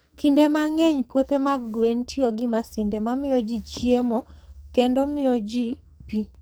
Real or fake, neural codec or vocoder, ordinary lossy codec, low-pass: fake; codec, 44.1 kHz, 2.6 kbps, SNAC; none; none